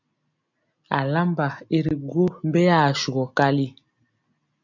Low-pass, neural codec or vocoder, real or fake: 7.2 kHz; none; real